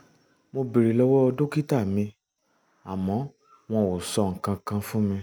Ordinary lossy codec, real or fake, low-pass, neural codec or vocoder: none; real; none; none